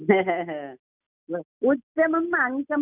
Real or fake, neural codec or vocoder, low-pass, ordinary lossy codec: real; none; 3.6 kHz; none